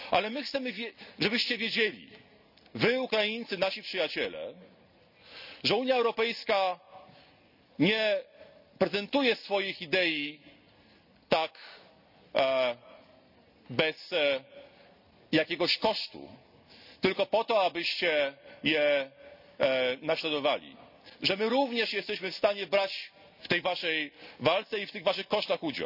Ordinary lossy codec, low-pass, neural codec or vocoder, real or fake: none; 5.4 kHz; none; real